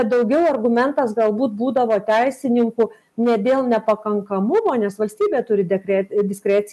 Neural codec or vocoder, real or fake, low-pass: none; real; 14.4 kHz